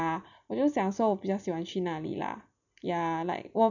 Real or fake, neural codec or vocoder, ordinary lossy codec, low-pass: real; none; none; 7.2 kHz